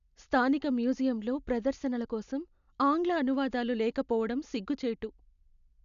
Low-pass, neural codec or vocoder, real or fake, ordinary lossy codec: 7.2 kHz; none; real; none